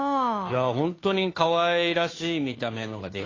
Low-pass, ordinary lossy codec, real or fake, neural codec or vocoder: 7.2 kHz; AAC, 32 kbps; fake; codec, 16 kHz, 2 kbps, FunCodec, trained on Chinese and English, 25 frames a second